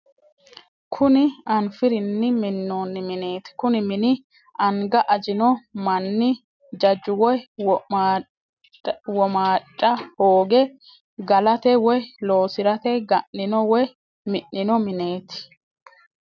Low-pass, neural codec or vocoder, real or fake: 7.2 kHz; none; real